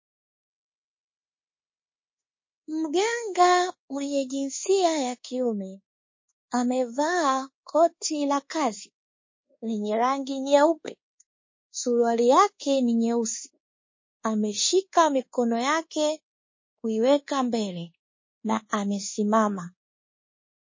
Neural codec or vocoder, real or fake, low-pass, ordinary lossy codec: codec, 24 kHz, 1.2 kbps, DualCodec; fake; 7.2 kHz; MP3, 32 kbps